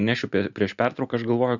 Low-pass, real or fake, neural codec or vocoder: 7.2 kHz; real; none